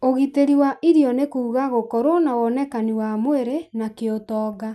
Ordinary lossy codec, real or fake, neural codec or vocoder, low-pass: none; real; none; none